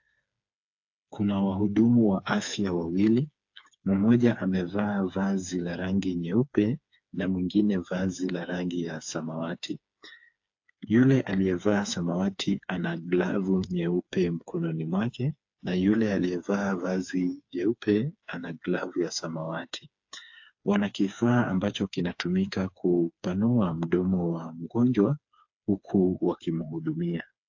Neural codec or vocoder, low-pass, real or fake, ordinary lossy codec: codec, 16 kHz, 4 kbps, FreqCodec, smaller model; 7.2 kHz; fake; AAC, 48 kbps